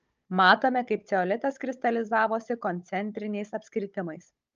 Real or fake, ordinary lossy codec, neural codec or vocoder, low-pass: fake; Opus, 24 kbps; codec, 16 kHz, 16 kbps, FunCodec, trained on Chinese and English, 50 frames a second; 7.2 kHz